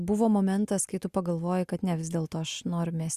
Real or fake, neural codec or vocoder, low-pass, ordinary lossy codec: real; none; 14.4 kHz; Opus, 64 kbps